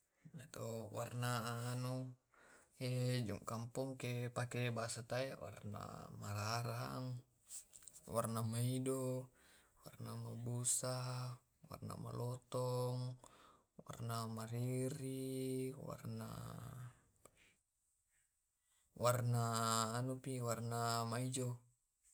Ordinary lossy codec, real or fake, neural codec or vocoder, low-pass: none; real; none; none